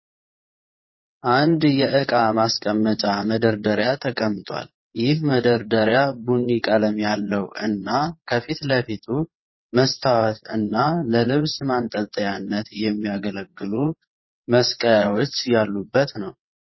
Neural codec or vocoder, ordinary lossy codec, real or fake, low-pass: vocoder, 22.05 kHz, 80 mel bands, WaveNeXt; MP3, 24 kbps; fake; 7.2 kHz